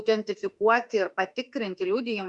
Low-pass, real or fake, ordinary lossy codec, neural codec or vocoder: 10.8 kHz; fake; Opus, 64 kbps; autoencoder, 48 kHz, 32 numbers a frame, DAC-VAE, trained on Japanese speech